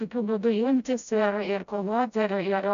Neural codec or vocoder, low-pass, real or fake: codec, 16 kHz, 0.5 kbps, FreqCodec, smaller model; 7.2 kHz; fake